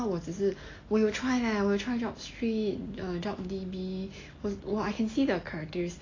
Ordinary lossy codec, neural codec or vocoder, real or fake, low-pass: AAC, 32 kbps; none; real; 7.2 kHz